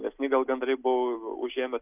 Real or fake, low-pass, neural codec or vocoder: real; 3.6 kHz; none